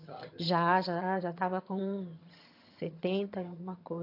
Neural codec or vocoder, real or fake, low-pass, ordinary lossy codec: vocoder, 22.05 kHz, 80 mel bands, HiFi-GAN; fake; 5.4 kHz; AAC, 32 kbps